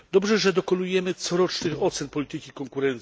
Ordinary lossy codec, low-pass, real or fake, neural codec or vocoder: none; none; real; none